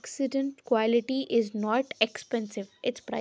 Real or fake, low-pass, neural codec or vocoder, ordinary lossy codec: real; none; none; none